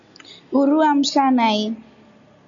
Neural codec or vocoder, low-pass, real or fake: none; 7.2 kHz; real